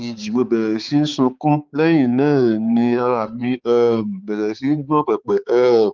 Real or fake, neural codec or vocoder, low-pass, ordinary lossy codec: fake; codec, 16 kHz, 4 kbps, X-Codec, HuBERT features, trained on balanced general audio; 7.2 kHz; Opus, 24 kbps